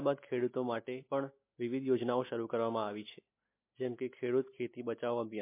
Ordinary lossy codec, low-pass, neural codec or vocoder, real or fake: MP3, 24 kbps; 3.6 kHz; none; real